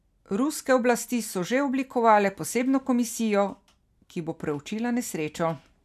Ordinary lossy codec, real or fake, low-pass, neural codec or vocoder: none; real; 14.4 kHz; none